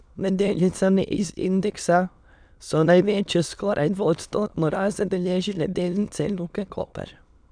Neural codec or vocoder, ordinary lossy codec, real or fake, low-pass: autoencoder, 22.05 kHz, a latent of 192 numbers a frame, VITS, trained on many speakers; none; fake; 9.9 kHz